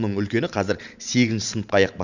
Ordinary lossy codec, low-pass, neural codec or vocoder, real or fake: none; 7.2 kHz; vocoder, 44.1 kHz, 128 mel bands every 512 samples, BigVGAN v2; fake